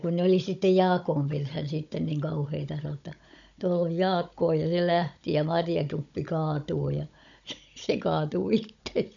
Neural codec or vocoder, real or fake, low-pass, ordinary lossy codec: codec, 16 kHz, 16 kbps, FunCodec, trained on Chinese and English, 50 frames a second; fake; 7.2 kHz; none